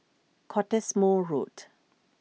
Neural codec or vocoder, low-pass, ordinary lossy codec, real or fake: none; none; none; real